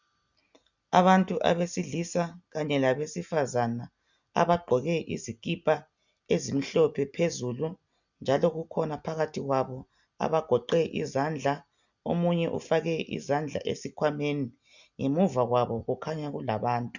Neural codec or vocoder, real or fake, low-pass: none; real; 7.2 kHz